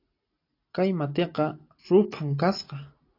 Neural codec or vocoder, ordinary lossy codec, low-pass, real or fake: none; AAC, 32 kbps; 5.4 kHz; real